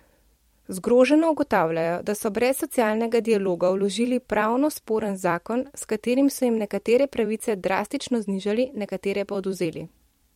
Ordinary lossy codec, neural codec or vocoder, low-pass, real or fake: MP3, 64 kbps; vocoder, 44.1 kHz, 128 mel bands every 256 samples, BigVGAN v2; 19.8 kHz; fake